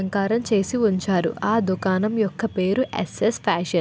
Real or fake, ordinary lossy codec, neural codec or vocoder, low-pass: real; none; none; none